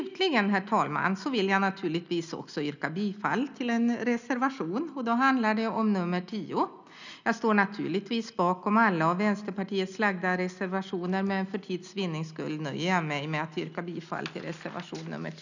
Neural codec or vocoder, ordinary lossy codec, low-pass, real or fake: none; none; 7.2 kHz; real